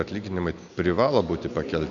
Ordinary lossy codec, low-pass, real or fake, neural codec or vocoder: MP3, 96 kbps; 7.2 kHz; real; none